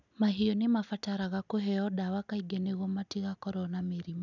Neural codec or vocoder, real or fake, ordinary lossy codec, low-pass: none; real; none; 7.2 kHz